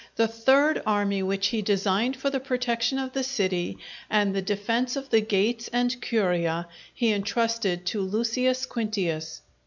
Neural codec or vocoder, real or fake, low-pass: none; real; 7.2 kHz